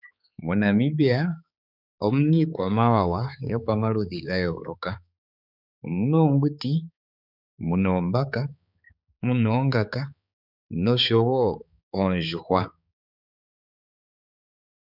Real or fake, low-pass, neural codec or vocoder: fake; 5.4 kHz; codec, 16 kHz, 4 kbps, X-Codec, HuBERT features, trained on balanced general audio